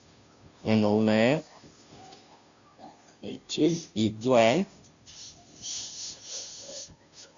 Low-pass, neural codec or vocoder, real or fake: 7.2 kHz; codec, 16 kHz, 0.5 kbps, FunCodec, trained on Chinese and English, 25 frames a second; fake